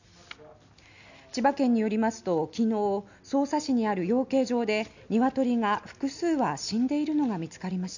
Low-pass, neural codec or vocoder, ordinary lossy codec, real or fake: 7.2 kHz; none; none; real